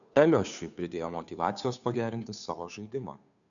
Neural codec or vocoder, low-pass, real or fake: codec, 16 kHz, 2 kbps, FunCodec, trained on Chinese and English, 25 frames a second; 7.2 kHz; fake